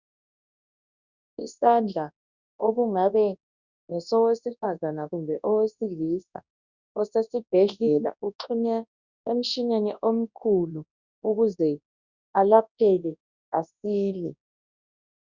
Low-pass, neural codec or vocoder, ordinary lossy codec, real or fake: 7.2 kHz; codec, 24 kHz, 0.9 kbps, WavTokenizer, large speech release; Opus, 64 kbps; fake